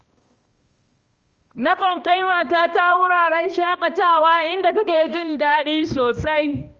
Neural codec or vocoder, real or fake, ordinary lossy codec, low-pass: codec, 16 kHz, 1 kbps, X-Codec, HuBERT features, trained on balanced general audio; fake; Opus, 24 kbps; 7.2 kHz